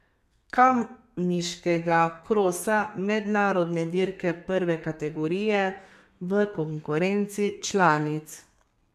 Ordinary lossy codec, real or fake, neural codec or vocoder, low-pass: MP3, 96 kbps; fake; codec, 32 kHz, 1.9 kbps, SNAC; 14.4 kHz